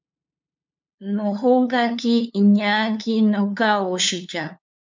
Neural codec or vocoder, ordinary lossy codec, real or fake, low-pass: codec, 16 kHz, 2 kbps, FunCodec, trained on LibriTTS, 25 frames a second; MP3, 64 kbps; fake; 7.2 kHz